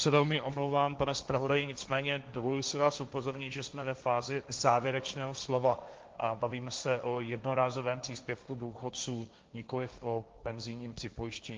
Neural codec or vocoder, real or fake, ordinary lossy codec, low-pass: codec, 16 kHz, 1.1 kbps, Voila-Tokenizer; fake; Opus, 32 kbps; 7.2 kHz